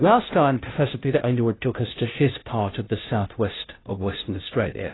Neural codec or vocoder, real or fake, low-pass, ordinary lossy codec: codec, 16 kHz, 0.5 kbps, FunCodec, trained on Chinese and English, 25 frames a second; fake; 7.2 kHz; AAC, 16 kbps